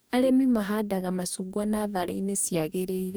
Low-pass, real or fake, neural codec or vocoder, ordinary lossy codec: none; fake; codec, 44.1 kHz, 2.6 kbps, DAC; none